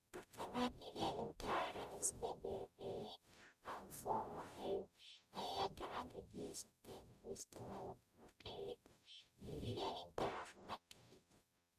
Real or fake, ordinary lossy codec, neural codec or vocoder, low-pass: fake; none; codec, 44.1 kHz, 0.9 kbps, DAC; 14.4 kHz